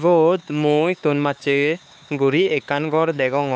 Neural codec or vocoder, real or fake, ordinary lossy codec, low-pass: codec, 16 kHz, 4 kbps, X-Codec, HuBERT features, trained on LibriSpeech; fake; none; none